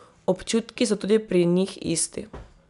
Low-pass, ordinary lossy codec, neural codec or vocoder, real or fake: 10.8 kHz; none; none; real